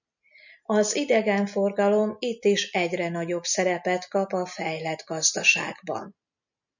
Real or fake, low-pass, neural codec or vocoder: real; 7.2 kHz; none